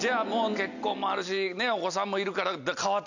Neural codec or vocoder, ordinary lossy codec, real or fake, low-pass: none; none; real; 7.2 kHz